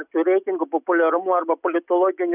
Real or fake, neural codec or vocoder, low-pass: real; none; 3.6 kHz